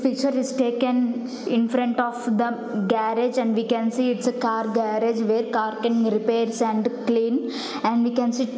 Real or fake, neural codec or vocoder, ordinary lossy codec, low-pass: real; none; none; none